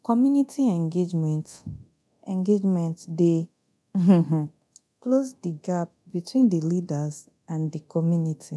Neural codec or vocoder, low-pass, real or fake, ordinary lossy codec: codec, 24 kHz, 0.9 kbps, DualCodec; none; fake; none